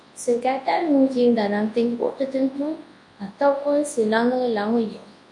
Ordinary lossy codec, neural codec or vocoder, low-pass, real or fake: MP3, 48 kbps; codec, 24 kHz, 0.9 kbps, WavTokenizer, large speech release; 10.8 kHz; fake